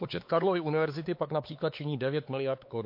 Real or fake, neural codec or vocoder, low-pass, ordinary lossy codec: fake; codec, 16 kHz, 4 kbps, X-Codec, HuBERT features, trained on LibriSpeech; 5.4 kHz; MP3, 32 kbps